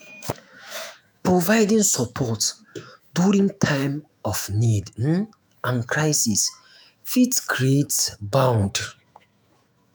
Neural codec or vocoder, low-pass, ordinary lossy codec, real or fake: autoencoder, 48 kHz, 128 numbers a frame, DAC-VAE, trained on Japanese speech; none; none; fake